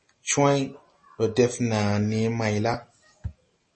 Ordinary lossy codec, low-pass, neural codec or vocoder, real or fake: MP3, 32 kbps; 10.8 kHz; none; real